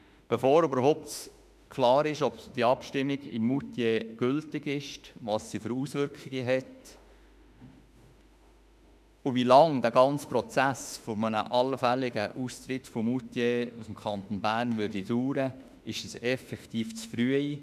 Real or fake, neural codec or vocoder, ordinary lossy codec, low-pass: fake; autoencoder, 48 kHz, 32 numbers a frame, DAC-VAE, trained on Japanese speech; none; 14.4 kHz